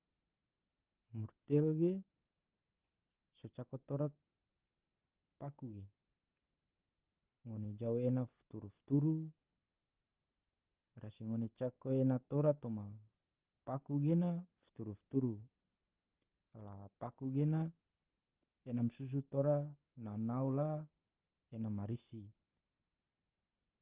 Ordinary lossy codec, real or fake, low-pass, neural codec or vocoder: Opus, 16 kbps; real; 3.6 kHz; none